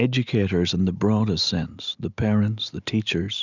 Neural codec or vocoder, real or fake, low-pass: none; real; 7.2 kHz